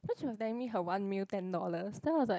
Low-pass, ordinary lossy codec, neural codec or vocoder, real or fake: none; none; none; real